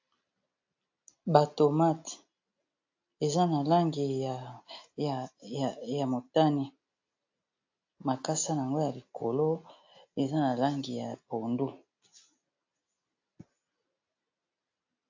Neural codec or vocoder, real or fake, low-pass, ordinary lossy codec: none; real; 7.2 kHz; AAC, 48 kbps